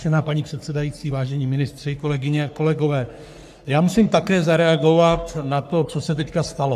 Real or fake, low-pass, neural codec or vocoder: fake; 14.4 kHz; codec, 44.1 kHz, 3.4 kbps, Pupu-Codec